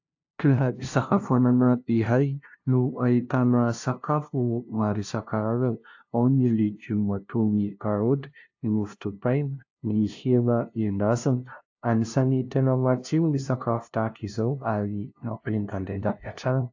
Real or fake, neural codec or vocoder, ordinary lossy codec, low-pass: fake; codec, 16 kHz, 0.5 kbps, FunCodec, trained on LibriTTS, 25 frames a second; AAC, 48 kbps; 7.2 kHz